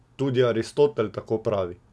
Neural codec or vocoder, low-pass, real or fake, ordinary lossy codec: none; none; real; none